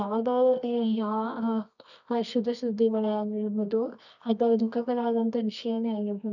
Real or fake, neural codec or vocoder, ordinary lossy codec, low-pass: fake; codec, 24 kHz, 0.9 kbps, WavTokenizer, medium music audio release; none; 7.2 kHz